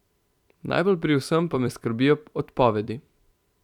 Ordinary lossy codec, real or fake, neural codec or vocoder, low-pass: none; fake; vocoder, 44.1 kHz, 128 mel bands every 256 samples, BigVGAN v2; 19.8 kHz